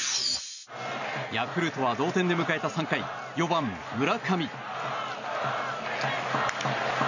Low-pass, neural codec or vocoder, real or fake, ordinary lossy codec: 7.2 kHz; none; real; none